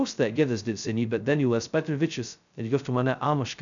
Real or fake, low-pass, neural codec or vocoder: fake; 7.2 kHz; codec, 16 kHz, 0.2 kbps, FocalCodec